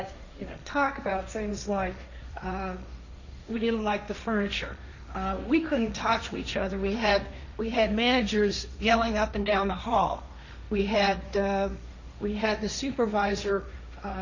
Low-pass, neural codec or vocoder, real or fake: 7.2 kHz; codec, 16 kHz, 1.1 kbps, Voila-Tokenizer; fake